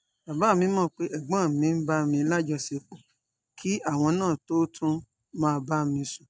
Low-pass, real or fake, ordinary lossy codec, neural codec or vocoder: none; real; none; none